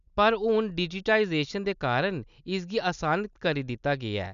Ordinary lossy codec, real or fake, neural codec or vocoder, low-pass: none; real; none; 7.2 kHz